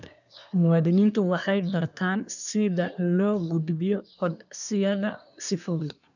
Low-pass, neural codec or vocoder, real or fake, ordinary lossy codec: 7.2 kHz; codec, 24 kHz, 1 kbps, SNAC; fake; none